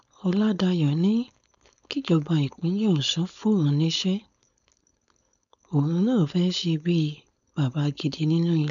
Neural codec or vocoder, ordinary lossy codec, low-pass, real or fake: codec, 16 kHz, 4.8 kbps, FACodec; none; 7.2 kHz; fake